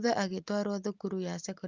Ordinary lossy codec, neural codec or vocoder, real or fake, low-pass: Opus, 24 kbps; none; real; 7.2 kHz